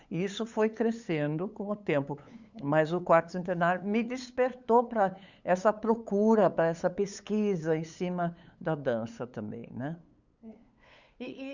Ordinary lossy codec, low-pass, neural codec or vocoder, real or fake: Opus, 64 kbps; 7.2 kHz; codec, 16 kHz, 8 kbps, FunCodec, trained on LibriTTS, 25 frames a second; fake